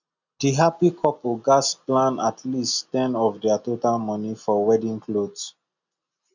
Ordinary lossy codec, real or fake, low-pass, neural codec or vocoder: none; real; 7.2 kHz; none